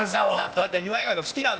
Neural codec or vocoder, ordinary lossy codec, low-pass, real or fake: codec, 16 kHz, 0.8 kbps, ZipCodec; none; none; fake